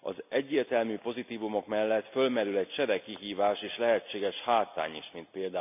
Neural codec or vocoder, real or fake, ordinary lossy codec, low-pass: none; real; none; 3.6 kHz